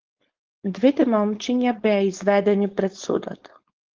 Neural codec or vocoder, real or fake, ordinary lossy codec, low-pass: codec, 16 kHz, 4.8 kbps, FACodec; fake; Opus, 16 kbps; 7.2 kHz